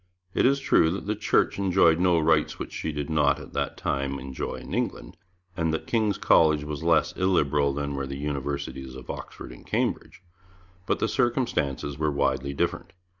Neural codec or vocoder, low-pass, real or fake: none; 7.2 kHz; real